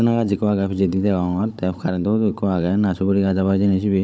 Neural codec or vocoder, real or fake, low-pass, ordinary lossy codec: codec, 16 kHz, 16 kbps, FunCodec, trained on Chinese and English, 50 frames a second; fake; none; none